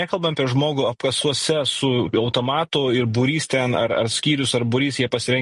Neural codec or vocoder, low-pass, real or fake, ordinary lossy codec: none; 14.4 kHz; real; MP3, 48 kbps